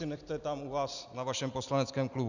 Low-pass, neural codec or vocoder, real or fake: 7.2 kHz; none; real